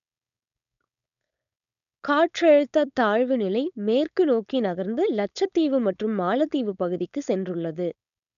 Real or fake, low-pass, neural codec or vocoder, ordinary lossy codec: fake; 7.2 kHz; codec, 16 kHz, 4.8 kbps, FACodec; none